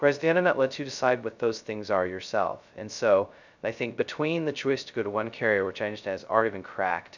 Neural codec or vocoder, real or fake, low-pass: codec, 16 kHz, 0.2 kbps, FocalCodec; fake; 7.2 kHz